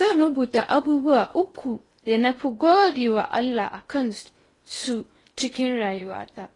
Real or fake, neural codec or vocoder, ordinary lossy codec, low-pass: fake; codec, 16 kHz in and 24 kHz out, 0.8 kbps, FocalCodec, streaming, 65536 codes; AAC, 32 kbps; 10.8 kHz